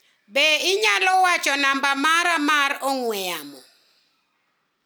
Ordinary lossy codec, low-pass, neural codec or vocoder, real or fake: none; none; none; real